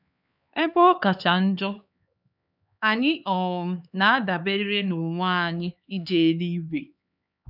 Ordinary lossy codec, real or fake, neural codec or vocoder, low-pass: none; fake; codec, 16 kHz, 4 kbps, X-Codec, HuBERT features, trained on LibriSpeech; 5.4 kHz